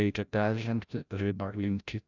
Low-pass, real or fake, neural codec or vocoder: 7.2 kHz; fake; codec, 16 kHz, 0.5 kbps, FreqCodec, larger model